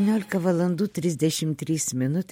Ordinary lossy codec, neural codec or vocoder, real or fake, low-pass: MP3, 64 kbps; none; real; 19.8 kHz